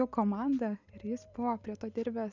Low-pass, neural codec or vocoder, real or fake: 7.2 kHz; none; real